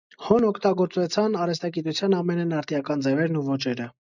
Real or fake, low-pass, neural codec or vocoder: real; 7.2 kHz; none